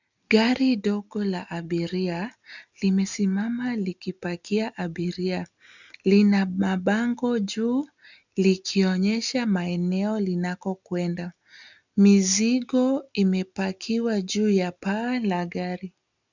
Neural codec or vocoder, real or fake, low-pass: none; real; 7.2 kHz